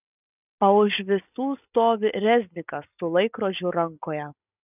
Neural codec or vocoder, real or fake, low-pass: none; real; 3.6 kHz